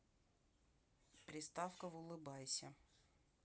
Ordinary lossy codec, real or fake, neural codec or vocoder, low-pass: none; real; none; none